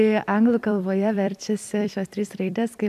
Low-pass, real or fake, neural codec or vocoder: 14.4 kHz; fake; vocoder, 44.1 kHz, 128 mel bands every 256 samples, BigVGAN v2